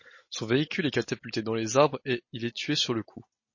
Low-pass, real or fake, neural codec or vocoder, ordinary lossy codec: 7.2 kHz; real; none; MP3, 32 kbps